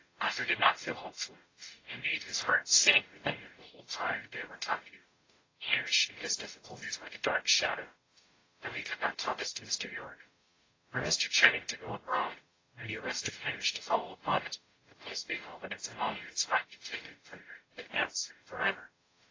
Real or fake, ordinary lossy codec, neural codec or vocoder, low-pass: fake; AAC, 32 kbps; codec, 44.1 kHz, 0.9 kbps, DAC; 7.2 kHz